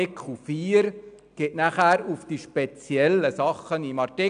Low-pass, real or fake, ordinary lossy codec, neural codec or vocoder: 9.9 kHz; real; none; none